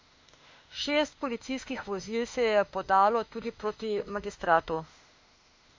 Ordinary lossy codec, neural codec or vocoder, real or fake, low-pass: MP3, 48 kbps; autoencoder, 48 kHz, 32 numbers a frame, DAC-VAE, trained on Japanese speech; fake; 7.2 kHz